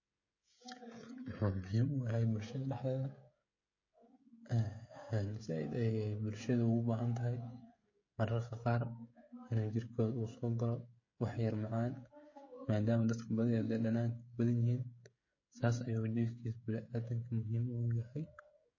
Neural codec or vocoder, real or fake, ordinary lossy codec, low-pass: codec, 16 kHz, 16 kbps, FreqCodec, smaller model; fake; MP3, 32 kbps; 7.2 kHz